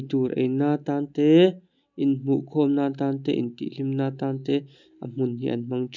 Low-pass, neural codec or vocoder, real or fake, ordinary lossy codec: 7.2 kHz; none; real; none